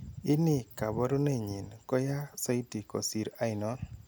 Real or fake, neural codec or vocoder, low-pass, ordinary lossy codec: real; none; none; none